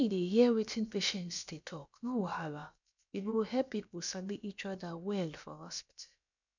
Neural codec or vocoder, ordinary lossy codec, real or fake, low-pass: codec, 16 kHz, about 1 kbps, DyCAST, with the encoder's durations; none; fake; 7.2 kHz